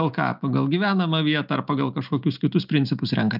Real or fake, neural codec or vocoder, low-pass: real; none; 5.4 kHz